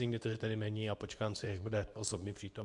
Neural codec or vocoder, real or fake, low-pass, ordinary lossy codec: codec, 24 kHz, 0.9 kbps, WavTokenizer, medium speech release version 2; fake; 10.8 kHz; MP3, 96 kbps